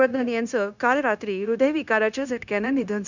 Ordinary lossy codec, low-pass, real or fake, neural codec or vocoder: none; 7.2 kHz; fake; codec, 16 kHz, 0.9 kbps, LongCat-Audio-Codec